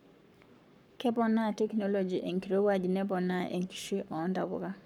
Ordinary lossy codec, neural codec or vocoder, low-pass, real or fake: none; codec, 44.1 kHz, 7.8 kbps, Pupu-Codec; 19.8 kHz; fake